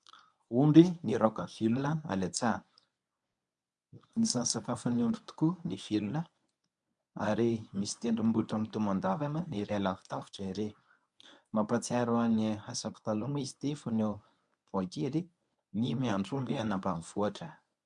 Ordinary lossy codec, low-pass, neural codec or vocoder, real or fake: MP3, 96 kbps; 10.8 kHz; codec, 24 kHz, 0.9 kbps, WavTokenizer, medium speech release version 1; fake